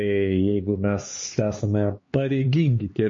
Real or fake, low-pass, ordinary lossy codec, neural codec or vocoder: fake; 7.2 kHz; MP3, 32 kbps; codec, 16 kHz, 4 kbps, X-Codec, HuBERT features, trained on LibriSpeech